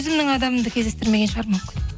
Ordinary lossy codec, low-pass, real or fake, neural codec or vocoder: none; none; real; none